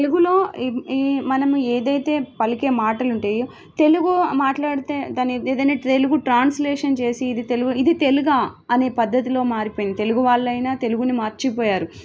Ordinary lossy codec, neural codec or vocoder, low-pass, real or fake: none; none; none; real